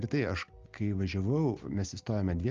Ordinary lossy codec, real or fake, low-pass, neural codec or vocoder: Opus, 24 kbps; real; 7.2 kHz; none